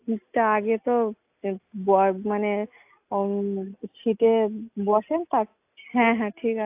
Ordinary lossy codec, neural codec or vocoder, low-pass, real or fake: AAC, 32 kbps; none; 3.6 kHz; real